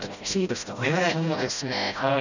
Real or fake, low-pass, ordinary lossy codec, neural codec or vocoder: fake; 7.2 kHz; none; codec, 16 kHz, 0.5 kbps, FreqCodec, smaller model